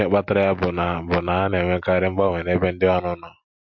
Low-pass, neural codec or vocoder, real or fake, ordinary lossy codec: 7.2 kHz; none; real; MP3, 48 kbps